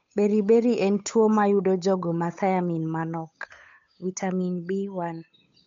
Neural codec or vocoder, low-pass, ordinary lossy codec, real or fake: codec, 16 kHz, 8 kbps, FunCodec, trained on Chinese and English, 25 frames a second; 7.2 kHz; MP3, 48 kbps; fake